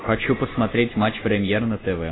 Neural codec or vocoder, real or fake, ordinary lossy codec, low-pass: none; real; AAC, 16 kbps; 7.2 kHz